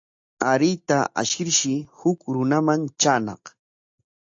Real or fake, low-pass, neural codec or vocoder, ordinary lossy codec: real; 7.2 kHz; none; AAC, 64 kbps